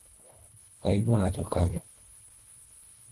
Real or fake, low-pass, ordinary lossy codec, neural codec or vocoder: fake; 10.8 kHz; Opus, 24 kbps; codec, 24 kHz, 1.5 kbps, HILCodec